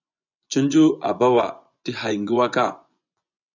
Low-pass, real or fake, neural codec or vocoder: 7.2 kHz; fake; vocoder, 24 kHz, 100 mel bands, Vocos